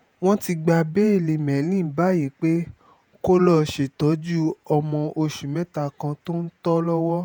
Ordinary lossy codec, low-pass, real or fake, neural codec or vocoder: none; none; fake; vocoder, 48 kHz, 128 mel bands, Vocos